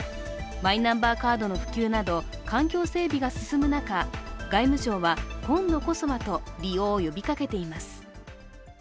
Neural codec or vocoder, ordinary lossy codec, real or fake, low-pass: none; none; real; none